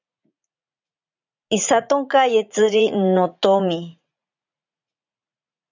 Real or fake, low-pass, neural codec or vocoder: fake; 7.2 kHz; vocoder, 44.1 kHz, 80 mel bands, Vocos